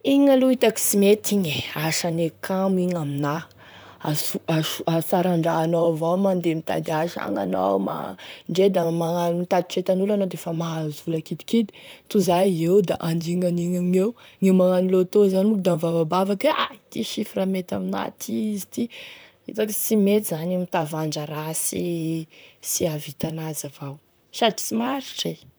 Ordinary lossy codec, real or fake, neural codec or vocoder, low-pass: none; fake; vocoder, 44.1 kHz, 128 mel bands, Pupu-Vocoder; none